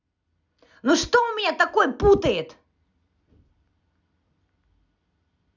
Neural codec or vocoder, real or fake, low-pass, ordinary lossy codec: none; real; 7.2 kHz; none